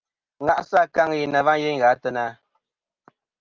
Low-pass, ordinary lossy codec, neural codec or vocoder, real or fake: 7.2 kHz; Opus, 24 kbps; none; real